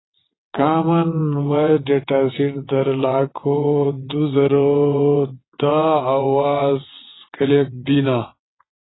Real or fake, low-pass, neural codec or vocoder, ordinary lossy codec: fake; 7.2 kHz; vocoder, 22.05 kHz, 80 mel bands, WaveNeXt; AAC, 16 kbps